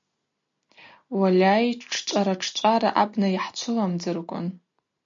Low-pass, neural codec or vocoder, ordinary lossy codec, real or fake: 7.2 kHz; none; MP3, 48 kbps; real